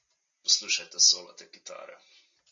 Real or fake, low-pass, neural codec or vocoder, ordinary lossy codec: real; 7.2 kHz; none; MP3, 48 kbps